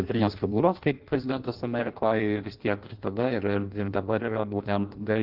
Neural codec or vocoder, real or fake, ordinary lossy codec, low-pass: codec, 16 kHz in and 24 kHz out, 0.6 kbps, FireRedTTS-2 codec; fake; Opus, 16 kbps; 5.4 kHz